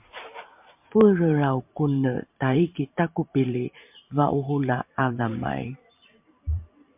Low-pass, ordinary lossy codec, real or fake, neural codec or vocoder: 3.6 kHz; MP3, 32 kbps; fake; vocoder, 44.1 kHz, 128 mel bands every 512 samples, BigVGAN v2